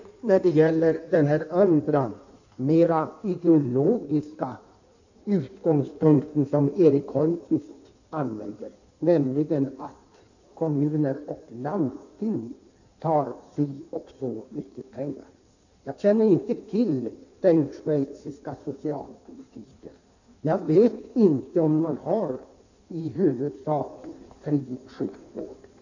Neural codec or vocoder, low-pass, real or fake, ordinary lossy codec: codec, 16 kHz in and 24 kHz out, 1.1 kbps, FireRedTTS-2 codec; 7.2 kHz; fake; none